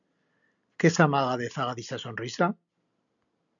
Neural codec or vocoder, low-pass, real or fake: none; 7.2 kHz; real